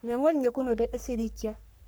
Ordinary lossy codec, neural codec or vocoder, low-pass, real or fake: none; codec, 44.1 kHz, 3.4 kbps, Pupu-Codec; none; fake